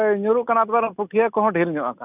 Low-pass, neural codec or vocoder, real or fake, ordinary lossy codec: 3.6 kHz; none; real; none